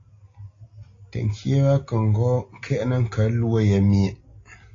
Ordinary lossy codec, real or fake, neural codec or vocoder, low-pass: AAC, 32 kbps; real; none; 7.2 kHz